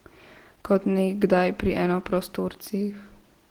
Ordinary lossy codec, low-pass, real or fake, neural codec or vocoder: Opus, 16 kbps; 19.8 kHz; real; none